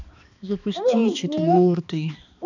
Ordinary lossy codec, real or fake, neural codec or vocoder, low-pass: none; fake; codec, 16 kHz, 2 kbps, X-Codec, HuBERT features, trained on balanced general audio; 7.2 kHz